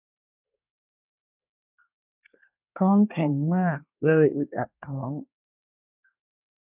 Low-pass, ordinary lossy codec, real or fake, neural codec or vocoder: 3.6 kHz; none; fake; codec, 24 kHz, 1 kbps, SNAC